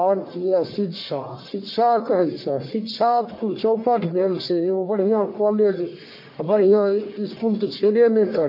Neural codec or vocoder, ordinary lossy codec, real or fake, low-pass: codec, 44.1 kHz, 1.7 kbps, Pupu-Codec; MP3, 32 kbps; fake; 5.4 kHz